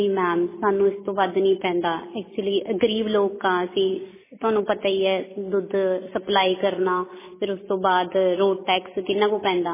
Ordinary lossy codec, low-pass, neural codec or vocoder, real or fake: MP3, 16 kbps; 3.6 kHz; none; real